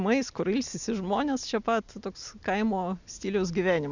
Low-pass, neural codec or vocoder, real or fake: 7.2 kHz; none; real